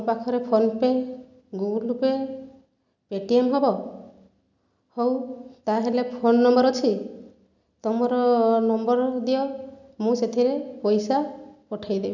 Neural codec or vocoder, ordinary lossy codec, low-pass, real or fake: none; none; 7.2 kHz; real